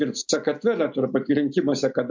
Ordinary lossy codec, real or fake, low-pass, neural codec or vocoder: MP3, 64 kbps; real; 7.2 kHz; none